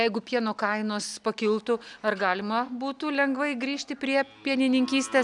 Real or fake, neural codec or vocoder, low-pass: real; none; 10.8 kHz